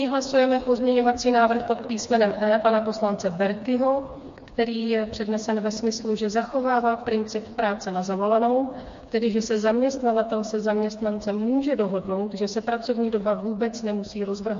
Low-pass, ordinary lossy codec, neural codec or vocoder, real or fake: 7.2 kHz; MP3, 48 kbps; codec, 16 kHz, 2 kbps, FreqCodec, smaller model; fake